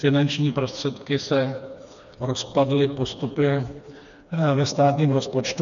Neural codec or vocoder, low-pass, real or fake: codec, 16 kHz, 2 kbps, FreqCodec, smaller model; 7.2 kHz; fake